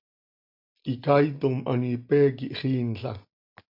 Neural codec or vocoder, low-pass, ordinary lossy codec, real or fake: none; 5.4 kHz; MP3, 48 kbps; real